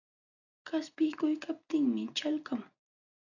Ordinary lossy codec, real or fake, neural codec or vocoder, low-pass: AAC, 48 kbps; real; none; 7.2 kHz